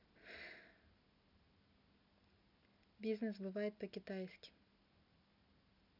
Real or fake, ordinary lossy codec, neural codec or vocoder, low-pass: real; none; none; 5.4 kHz